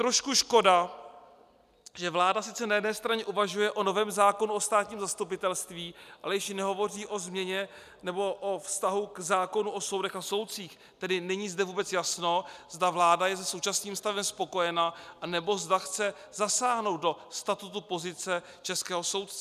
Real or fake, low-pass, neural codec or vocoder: real; 14.4 kHz; none